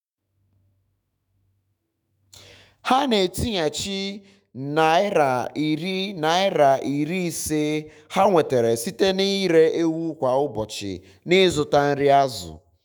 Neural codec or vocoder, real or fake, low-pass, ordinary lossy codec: autoencoder, 48 kHz, 128 numbers a frame, DAC-VAE, trained on Japanese speech; fake; none; none